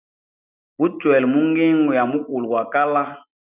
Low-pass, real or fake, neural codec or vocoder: 3.6 kHz; real; none